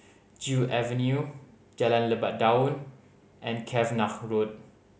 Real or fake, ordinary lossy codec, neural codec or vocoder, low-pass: real; none; none; none